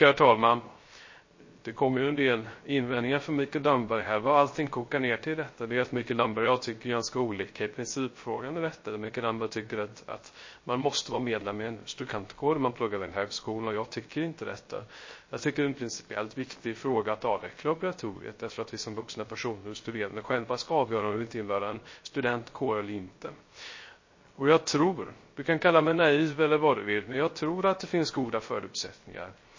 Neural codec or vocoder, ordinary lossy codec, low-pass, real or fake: codec, 16 kHz, 0.3 kbps, FocalCodec; MP3, 32 kbps; 7.2 kHz; fake